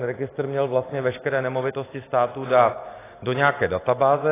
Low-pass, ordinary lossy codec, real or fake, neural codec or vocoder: 3.6 kHz; AAC, 16 kbps; real; none